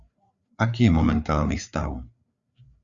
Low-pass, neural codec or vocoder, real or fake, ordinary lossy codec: 7.2 kHz; codec, 16 kHz, 4 kbps, FreqCodec, larger model; fake; Opus, 64 kbps